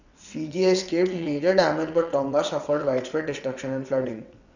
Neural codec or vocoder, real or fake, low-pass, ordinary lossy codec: vocoder, 22.05 kHz, 80 mel bands, WaveNeXt; fake; 7.2 kHz; none